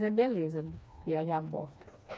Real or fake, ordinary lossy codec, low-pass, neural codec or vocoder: fake; none; none; codec, 16 kHz, 2 kbps, FreqCodec, smaller model